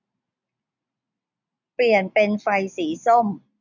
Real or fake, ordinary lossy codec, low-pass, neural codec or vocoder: real; none; 7.2 kHz; none